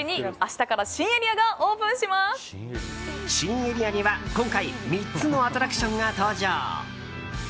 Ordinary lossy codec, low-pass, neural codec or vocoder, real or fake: none; none; none; real